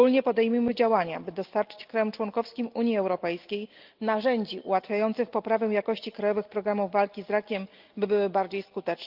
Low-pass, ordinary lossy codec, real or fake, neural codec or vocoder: 5.4 kHz; Opus, 32 kbps; real; none